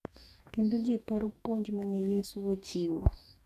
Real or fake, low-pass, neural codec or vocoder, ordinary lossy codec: fake; 14.4 kHz; codec, 44.1 kHz, 2.6 kbps, DAC; none